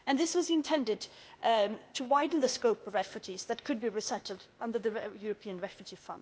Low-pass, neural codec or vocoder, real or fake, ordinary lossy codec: none; codec, 16 kHz, 0.8 kbps, ZipCodec; fake; none